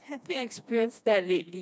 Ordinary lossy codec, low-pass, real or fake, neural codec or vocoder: none; none; fake; codec, 16 kHz, 2 kbps, FreqCodec, smaller model